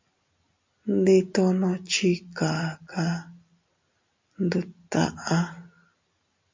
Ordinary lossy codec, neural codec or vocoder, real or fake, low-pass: MP3, 48 kbps; none; real; 7.2 kHz